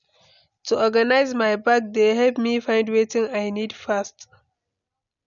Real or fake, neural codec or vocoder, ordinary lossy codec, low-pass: real; none; none; 7.2 kHz